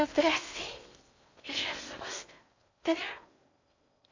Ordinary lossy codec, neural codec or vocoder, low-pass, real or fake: AAC, 48 kbps; codec, 16 kHz in and 24 kHz out, 0.6 kbps, FocalCodec, streaming, 4096 codes; 7.2 kHz; fake